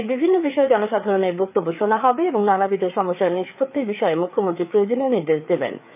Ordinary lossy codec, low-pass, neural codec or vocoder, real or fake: none; 3.6 kHz; codec, 16 kHz, 4 kbps, FreqCodec, larger model; fake